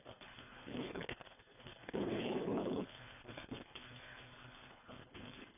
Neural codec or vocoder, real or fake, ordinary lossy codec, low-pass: codec, 24 kHz, 3 kbps, HILCodec; fake; none; 3.6 kHz